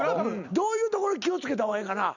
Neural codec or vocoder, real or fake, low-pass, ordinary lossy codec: none; real; 7.2 kHz; none